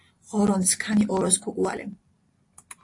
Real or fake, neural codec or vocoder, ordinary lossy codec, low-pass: real; none; AAC, 48 kbps; 10.8 kHz